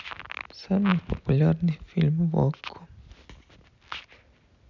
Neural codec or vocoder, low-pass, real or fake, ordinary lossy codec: none; 7.2 kHz; real; none